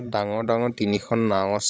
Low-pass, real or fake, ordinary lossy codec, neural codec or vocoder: none; fake; none; codec, 16 kHz, 16 kbps, FreqCodec, larger model